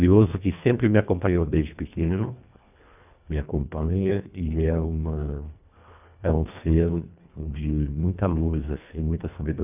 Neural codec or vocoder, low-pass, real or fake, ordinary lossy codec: codec, 24 kHz, 1.5 kbps, HILCodec; 3.6 kHz; fake; none